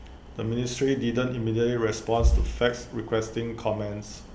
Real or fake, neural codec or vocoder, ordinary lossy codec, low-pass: real; none; none; none